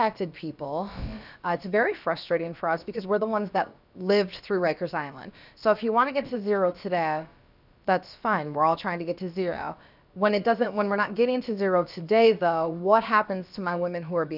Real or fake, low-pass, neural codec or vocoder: fake; 5.4 kHz; codec, 16 kHz, about 1 kbps, DyCAST, with the encoder's durations